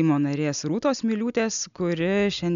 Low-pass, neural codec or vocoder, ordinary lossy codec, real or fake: 7.2 kHz; none; MP3, 96 kbps; real